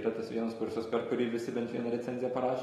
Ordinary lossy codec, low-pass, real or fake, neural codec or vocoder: AAC, 32 kbps; 19.8 kHz; real; none